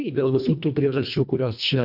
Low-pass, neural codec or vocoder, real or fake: 5.4 kHz; codec, 24 kHz, 1.5 kbps, HILCodec; fake